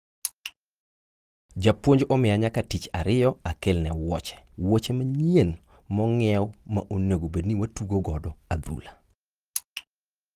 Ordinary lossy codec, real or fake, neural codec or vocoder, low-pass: Opus, 24 kbps; real; none; 14.4 kHz